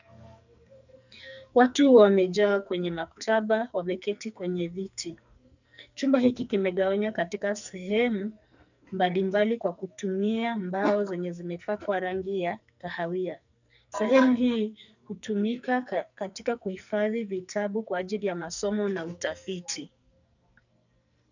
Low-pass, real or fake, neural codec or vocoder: 7.2 kHz; fake; codec, 44.1 kHz, 2.6 kbps, SNAC